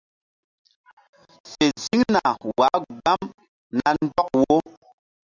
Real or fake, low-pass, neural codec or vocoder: real; 7.2 kHz; none